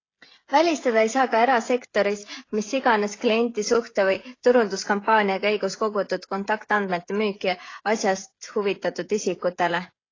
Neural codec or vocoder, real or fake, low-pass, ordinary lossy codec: codec, 16 kHz, 16 kbps, FreqCodec, smaller model; fake; 7.2 kHz; AAC, 32 kbps